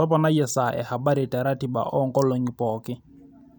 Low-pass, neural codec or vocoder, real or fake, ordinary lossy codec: none; none; real; none